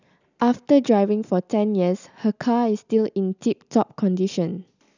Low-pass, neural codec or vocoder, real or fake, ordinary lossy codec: 7.2 kHz; none; real; none